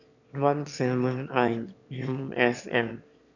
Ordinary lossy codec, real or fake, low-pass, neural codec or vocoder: none; fake; 7.2 kHz; autoencoder, 22.05 kHz, a latent of 192 numbers a frame, VITS, trained on one speaker